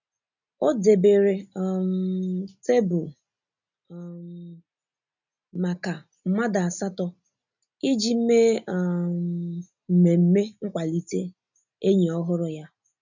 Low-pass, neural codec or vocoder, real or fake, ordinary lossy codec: 7.2 kHz; none; real; none